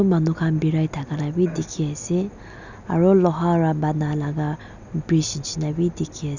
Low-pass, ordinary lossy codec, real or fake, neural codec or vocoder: 7.2 kHz; none; real; none